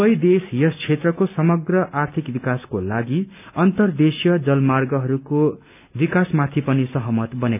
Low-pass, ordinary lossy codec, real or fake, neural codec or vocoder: 3.6 kHz; none; real; none